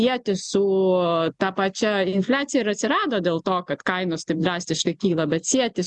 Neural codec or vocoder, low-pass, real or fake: none; 10.8 kHz; real